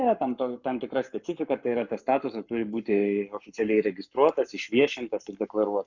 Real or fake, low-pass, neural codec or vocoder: fake; 7.2 kHz; codec, 44.1 kHz, 7.8 kbps, Pupu-Codec